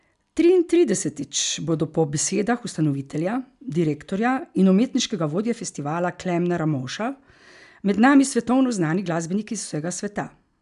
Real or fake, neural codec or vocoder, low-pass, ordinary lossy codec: real; none; 10.8 kHz; none